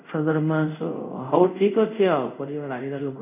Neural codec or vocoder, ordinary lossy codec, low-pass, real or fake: codec, 24 kHz, 0.5 kbps, DualCodec; none; 3.6 kHz; fake